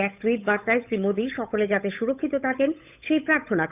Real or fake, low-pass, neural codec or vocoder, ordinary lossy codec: fake; 3.6 kHz; codec, 16 kHz, 8 kbps, FunCodec, trained on Chinese and English, 25 frames a second; none